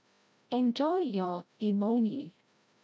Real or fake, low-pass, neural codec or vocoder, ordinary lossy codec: fake; none; codec, 16 kHz, 0.5 kbps, FreqCodec, larger model; none